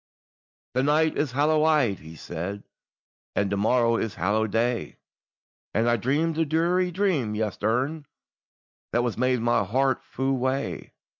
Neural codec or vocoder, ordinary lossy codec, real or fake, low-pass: none; MP3, 64 kbps; real; 7.2 kHz